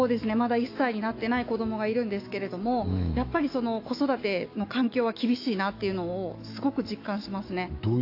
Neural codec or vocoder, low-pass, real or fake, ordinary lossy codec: autoencoder, 48 kHz, 128 numbers a frame, DAC-VAE, trained on Japanese speech; 5.4 kHz; fake; AAC, 32 kbps